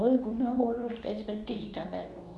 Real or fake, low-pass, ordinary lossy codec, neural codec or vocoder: fake; none; none; codec, 24 kHz, 0.9 kbps, WavTokenizer, medium speech release version 2